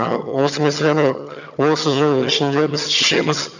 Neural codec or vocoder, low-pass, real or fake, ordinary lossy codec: vocoder, 22.05 kHz, 80 mel bands, HiFi-GAN; 7.2 kHz; fake; none